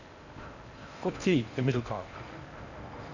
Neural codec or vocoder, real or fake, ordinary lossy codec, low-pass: codec, 16 kHz in and 24 kHz out, 0.6 kbps, FocalCodec, streaming, 4096 codes; fake; none; 7.2 kHz